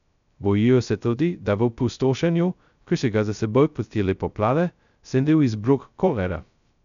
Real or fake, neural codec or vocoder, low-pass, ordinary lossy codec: fake; codec, 16 kHz, 0.2 kbps, FocalCodec; 7.2 kHz; none